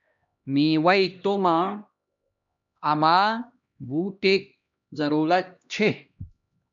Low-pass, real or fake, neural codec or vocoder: 7.2 kHz; fake; codec, 16 kHz, 1 kbps, X-Codec, HuBERT features, trained on LibriSpeech